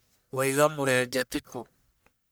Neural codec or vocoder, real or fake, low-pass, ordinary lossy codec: codec, 44.1 kHz, 1.7 kbps, Pupu-Codec; fake; none; none